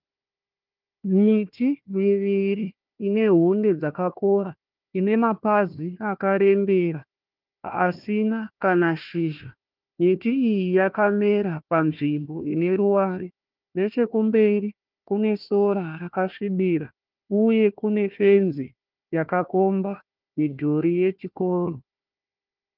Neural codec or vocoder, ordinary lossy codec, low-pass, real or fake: codec, 16 kHz, 1 kbps, FunCodec, trained on Chinese and English, 50 frames a second; Opus, 32 kbps; 5.4 kHz; fake